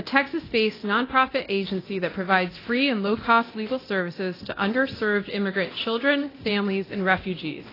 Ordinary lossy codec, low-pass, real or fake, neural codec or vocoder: AAC, 24 kbps; 5.4 kHz; fake; codec, 24 kHz, 0.9 kbps, DualCodec